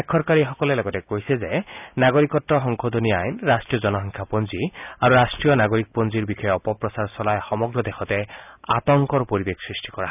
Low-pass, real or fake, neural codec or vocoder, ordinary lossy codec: 3.6 kHz; real; none; none